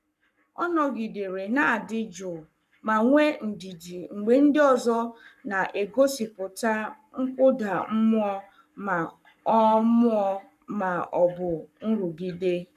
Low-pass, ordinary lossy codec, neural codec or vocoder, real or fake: 14.4 kHz; none; codec, 44.1 kHz, 7.8 kbps, Pupu-Codec; fake